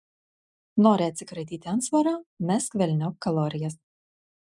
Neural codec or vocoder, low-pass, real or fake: none; 10.8 kHz; real